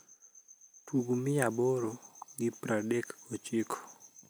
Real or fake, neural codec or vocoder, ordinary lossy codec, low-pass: real; none; none; none